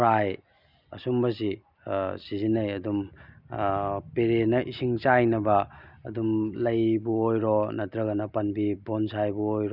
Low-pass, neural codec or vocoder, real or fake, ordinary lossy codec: 5.4 kHz; none; real; none